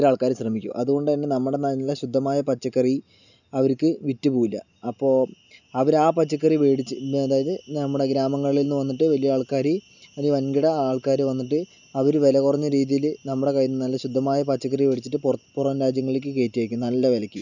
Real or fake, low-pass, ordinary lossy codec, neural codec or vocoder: real; 7.2 kHz; none; none